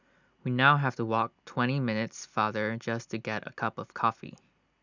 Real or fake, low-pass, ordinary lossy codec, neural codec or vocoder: real; 7.2 kHz; none; none